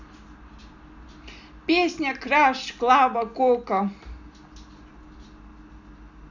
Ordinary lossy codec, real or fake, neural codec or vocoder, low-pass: none; real; none; 7.2 kHz